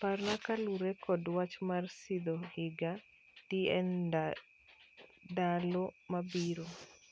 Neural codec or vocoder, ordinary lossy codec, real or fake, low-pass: none; none; real; none